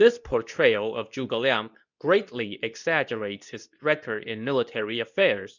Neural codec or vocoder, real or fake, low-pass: codec, 24 kHz, 0.9 kbps, WavTokenizer, medium speech release version 2; fake; 7.2 kHz